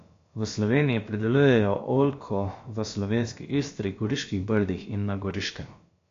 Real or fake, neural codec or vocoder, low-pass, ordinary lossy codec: fake; codec, 16 kHz, about 1 kbps, DyCAST, with the encoder's durations; 7.2 kHz; AAC, 48 kbps